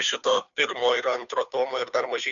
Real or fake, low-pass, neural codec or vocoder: fake; 7.2 kHz; codec, 16 kHz, 2 kbps, FunCodec, trained on Chinese and English, 25 frames a second